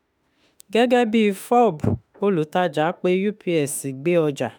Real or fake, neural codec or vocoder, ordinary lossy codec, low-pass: fake; autoencoder, 48 kHz, 32 numbers a frame, DAC-VAE, trained on Japanese speech; none; none